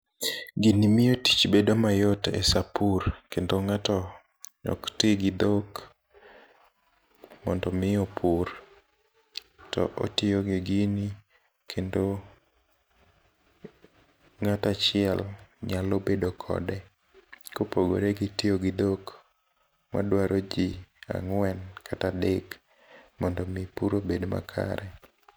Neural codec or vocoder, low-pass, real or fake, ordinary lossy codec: none; none; real; none